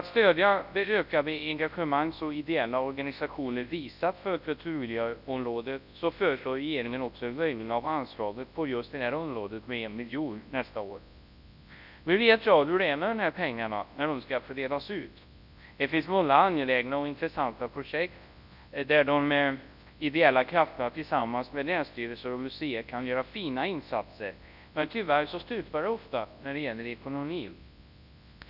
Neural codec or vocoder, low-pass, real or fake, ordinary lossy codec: codec, 24 kHz, 0.9 kbps, WavTokenizer, large speech release; 5.4 kHz; fake; none